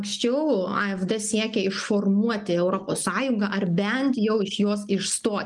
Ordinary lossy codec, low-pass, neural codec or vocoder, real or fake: Opus, 32 kbps; 10.8 kHz; none; real